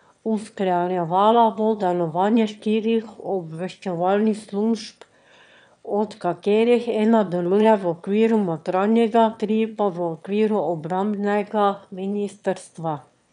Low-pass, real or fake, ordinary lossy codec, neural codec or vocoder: 9.9 kHz; fake; none; autoencoder, 22.05 kHz, a latent of 192 numbers a frame, VITS, trained on one speaker